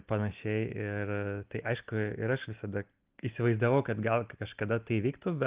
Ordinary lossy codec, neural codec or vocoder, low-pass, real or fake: Opus, 32 kbps; none; 3.6 kHz; real